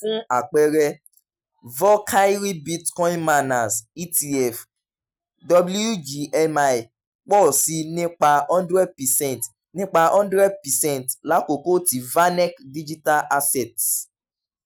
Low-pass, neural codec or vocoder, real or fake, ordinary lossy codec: none; none; real; none